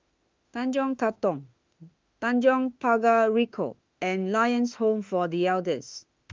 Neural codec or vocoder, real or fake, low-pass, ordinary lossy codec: autoencoder, 48 kHz, 32 numbers a frame, DAC-VAE, trained on Japanese speech; fake; 7.2 kHz; Opus, 32 kbps